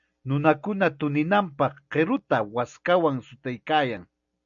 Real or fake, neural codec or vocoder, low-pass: real; none; 7.2 kHz